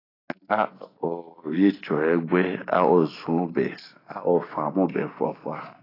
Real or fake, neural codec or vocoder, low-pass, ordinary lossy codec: real; none; 5.4 kHz; AAC, 24 kbps